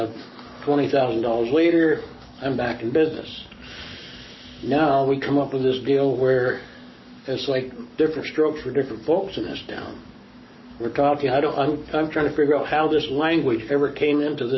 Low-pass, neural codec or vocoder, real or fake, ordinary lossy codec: 7.2 kHz; codec, 44.1 kHz, 7.8 kbps, Pupu-Codec; fake; MP3, 24 kbps